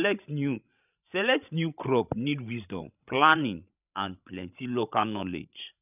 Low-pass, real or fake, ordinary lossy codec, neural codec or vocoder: 3.6 kHz; fake; none; codec, 16 kHz, 16 kbps, FreqCodec, larger model